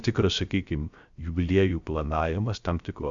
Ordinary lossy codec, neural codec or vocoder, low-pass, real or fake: Opus, 64 kbps; codec, 16 kHz, 0.3 kbps, FocalCodec; 7.2 kHz; fake